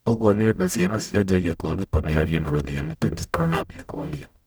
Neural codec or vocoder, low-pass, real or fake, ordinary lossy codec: codec, 44.1 kHz, 0.9 kbps, DAC; none; fake; none